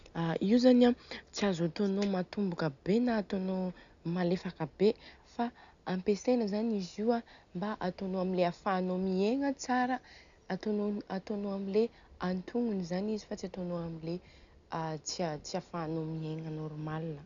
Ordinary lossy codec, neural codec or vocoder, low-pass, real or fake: none; none; 7.2 kHz; real